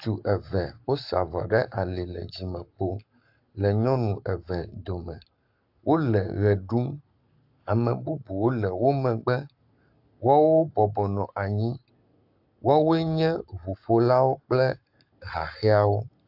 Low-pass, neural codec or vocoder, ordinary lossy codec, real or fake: 5.4 kHz; codec, 44.1 kHz, 7.8 kbps, Pupu-Codec; AAC, 48 kbps; fake